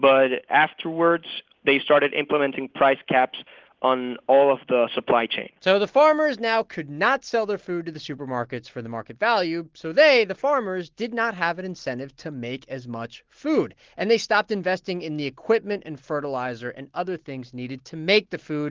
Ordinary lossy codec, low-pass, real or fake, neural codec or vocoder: Opus, 16 kbps; 7.2 kHz; real; none